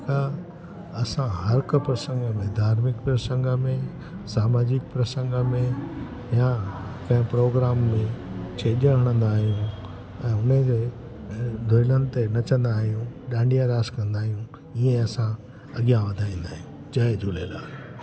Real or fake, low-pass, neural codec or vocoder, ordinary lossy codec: real; none; none; none